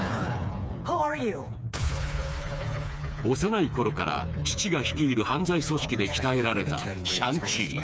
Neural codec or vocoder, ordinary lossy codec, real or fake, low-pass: codec, 16 kHz, 4 kbps, FreqCodec, smaller model; none; fake; none